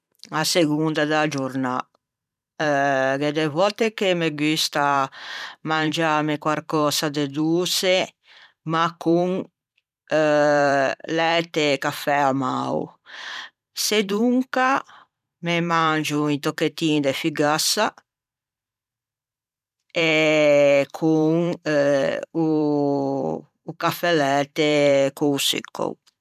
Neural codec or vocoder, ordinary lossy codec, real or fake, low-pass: vocoder, 44.1 kHz, 128 mel bands every 512 samples, BigVGAN v2; none; fake; 14.4 kHz